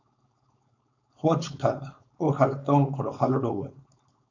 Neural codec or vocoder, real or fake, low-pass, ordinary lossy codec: codec, 16 kHz, 4.8 kbps, FACodec; fake; 7.2 kHz; MP3, 64 kbps